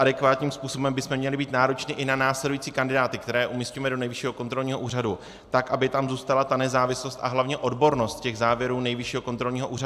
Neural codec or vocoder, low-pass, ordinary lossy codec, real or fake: none; 14.4 kHz; AAC, 96 kbps; real